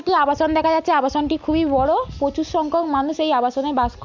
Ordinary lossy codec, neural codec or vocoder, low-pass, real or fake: MP3, 64 kbps; none; 7.2 kHz; real